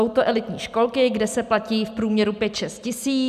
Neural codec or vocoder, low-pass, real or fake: none; 14.4 kHz; real